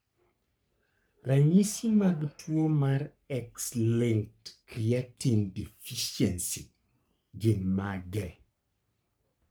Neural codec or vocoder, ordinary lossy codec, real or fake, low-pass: codec, 44.1 kHz, 3.4 kbps, Pupu-Codec; none; fake; none